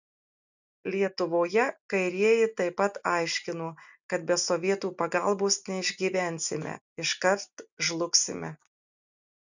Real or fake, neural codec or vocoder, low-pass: real; none; 7.2 kHz